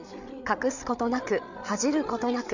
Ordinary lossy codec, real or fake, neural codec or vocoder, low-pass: none; fake; codec, 16 kHz, 8 kbps, FreqCodec, larger model; 7.2 kHz